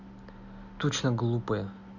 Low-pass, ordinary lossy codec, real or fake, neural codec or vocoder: 7.2 kHz; none; real; none